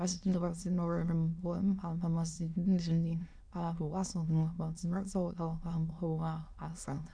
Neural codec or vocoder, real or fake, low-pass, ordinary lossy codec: autoencoder, 22.05 kHz, a latent of 192 numbers a frame, VITS, trained on many speakers; fake; 9.9 kHz; none